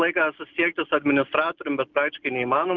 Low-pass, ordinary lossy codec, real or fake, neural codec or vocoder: 7.2 kHz; Opus, 24 kbps; real; none